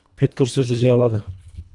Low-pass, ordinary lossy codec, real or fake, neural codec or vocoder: 10.8 kHz; AAC, 64 kbps; fake; codec, 24 kHz, 1.5 kbps, HILCodec